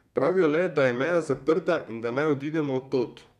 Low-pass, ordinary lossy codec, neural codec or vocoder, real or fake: 14.4 kHz; none; codec, 32 kHz, 1.9 kbps, SNAC; fake